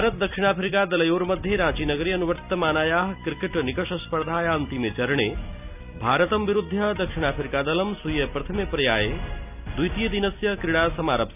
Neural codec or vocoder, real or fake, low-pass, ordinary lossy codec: none; real; 3.6 kHz; none